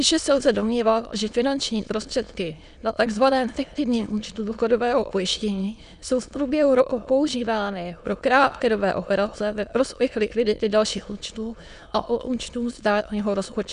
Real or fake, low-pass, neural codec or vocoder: fake; 9.9 kHz; autoencoder, 22.05 kHz, a latent of 192 numbers a frame, VITS, trained on many speakers